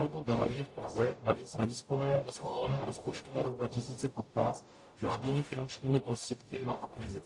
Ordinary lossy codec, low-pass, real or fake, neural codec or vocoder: AAC, 48 kbps; 10.8 kHz; fake; codec, 44.1 kHz, 0.9 kbps, DAC